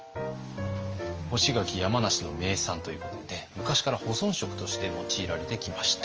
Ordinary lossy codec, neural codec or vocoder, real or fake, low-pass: Opus, 24 kbps; none; real; 7.2 kHz